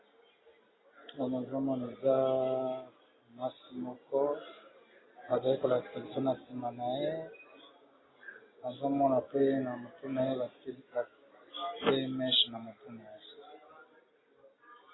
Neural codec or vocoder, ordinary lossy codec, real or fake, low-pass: none; AAC, 16 kbps; real; 7.2 kHz